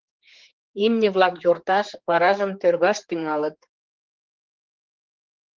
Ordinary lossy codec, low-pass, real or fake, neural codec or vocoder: Opus, 16 kbps; 7.2 kHz; fake; codec, 16 kHz, 4 kbps, X-Codec, HuBERT features, trained on general audio